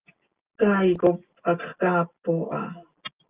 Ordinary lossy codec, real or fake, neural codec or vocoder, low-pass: Opus, 24 kbps; real; none; 3.6 kHz